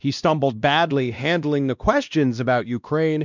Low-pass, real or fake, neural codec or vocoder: 7.2 kHz; fake; codec, 16 kHz, 1 kbps, X-Codec, WavLM features, trained on Multilingual LibriSpeech